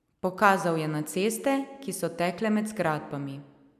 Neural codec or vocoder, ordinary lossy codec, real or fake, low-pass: none; none; real; 14.4 kHz